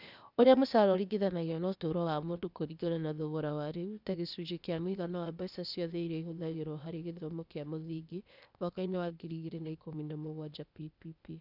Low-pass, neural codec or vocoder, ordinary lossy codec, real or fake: 5.4 kHz; codec, 16 kHz, 0.8 kbps, ZipCodec; none; fake